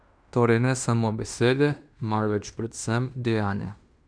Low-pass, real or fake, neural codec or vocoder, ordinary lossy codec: 9.9 kHz; fake; codec, 16 kHz in and 24 kHz out, 0.9 kbps, LongCat-Audio-Codec, fine tuned four codebook decoder; none